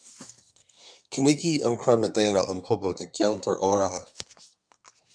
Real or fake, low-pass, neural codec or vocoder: fake; 9.9 kHz; codec, 24 kHz, 1 kbps, SNAC